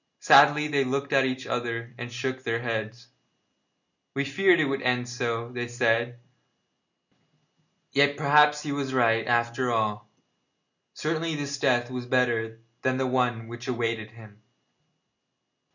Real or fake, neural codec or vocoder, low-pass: real; none; 7.2 kHz